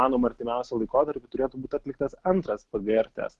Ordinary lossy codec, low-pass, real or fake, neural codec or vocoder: Opus, 16 kbps; 10.8 kHz; real; none